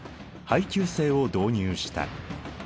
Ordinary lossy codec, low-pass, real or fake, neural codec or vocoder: none; none; real; none